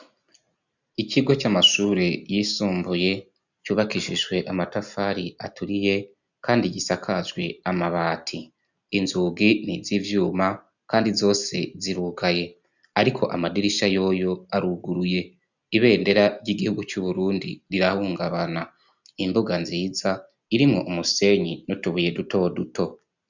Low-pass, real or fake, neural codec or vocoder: 7.2 kHz; real; none